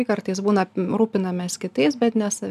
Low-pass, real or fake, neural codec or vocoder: 14.4 kHz; real; none